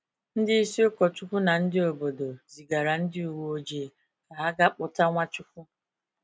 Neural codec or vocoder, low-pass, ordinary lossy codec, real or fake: none; none; none; real